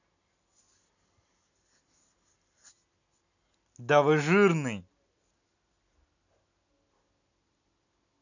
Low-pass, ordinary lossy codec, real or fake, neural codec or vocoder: 7.2 kHz; none; real; none